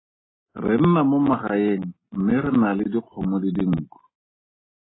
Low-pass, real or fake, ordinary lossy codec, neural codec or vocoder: 7.2 kHz; real; AAC, 16 kbps; none